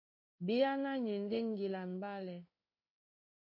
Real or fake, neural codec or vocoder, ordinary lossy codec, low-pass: fake; codec, 16 kHz in and 24 kHz out, 1 kbps, XY-Tokenizer; MP3, 32 kbps; 5.4 kHz